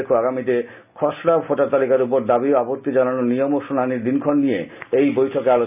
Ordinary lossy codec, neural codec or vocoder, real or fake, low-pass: none; none; real; 3.6 kHz